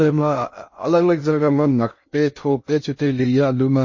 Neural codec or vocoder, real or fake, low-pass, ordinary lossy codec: codec, 16 kHz in and 24 kHz out, 0.6 kbps, FocalCodec, streaming, 2048 codes; fake; 7.2 kHz; MP3, 32 kbps